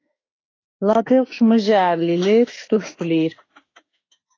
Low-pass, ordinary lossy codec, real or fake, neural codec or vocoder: 7.2 kHz; AAC, 32 kbps; fake; autoencoder, 48 kHz, 32 numbers a frame, DAC-VAE, trained on Japanese speech